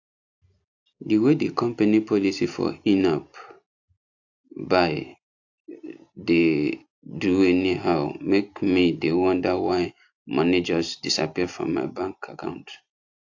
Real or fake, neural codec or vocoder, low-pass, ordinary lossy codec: real; none; 7.2 kHz; AAC, 48 kbps